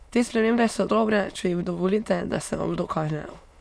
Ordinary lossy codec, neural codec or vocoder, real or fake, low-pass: none; autoencoder, 22.05 kHz, a latent of 192 numbers a frame, VITS, trained on many speakers; fake; none